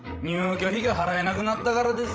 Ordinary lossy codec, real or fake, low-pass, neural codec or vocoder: none; fake; none; codec, 16 kHz, 16 kbps, FreqCodec, larger model